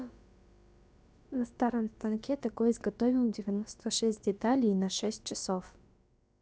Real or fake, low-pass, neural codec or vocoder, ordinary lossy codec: fake; none; codec, 16 kHz, about 1 kbps, DyCAST, with the encoder's durations; none